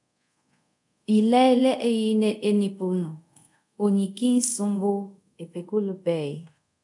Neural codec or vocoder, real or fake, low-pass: codec, 24 kHz, 0.5 kbps, DualCodec; fake; 10.8 kHz